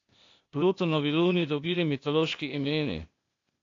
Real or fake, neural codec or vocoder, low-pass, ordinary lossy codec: fake; codec, 16 kHz, 0.8 kbps, ZipCodec; 7.2 kHz; AAC, 48 kbps